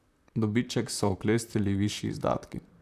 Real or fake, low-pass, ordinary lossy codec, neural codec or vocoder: fake; 14.4 kHz; none; vocoder, 44.1 kHz, 128 mel bands, Pupu-Vocoder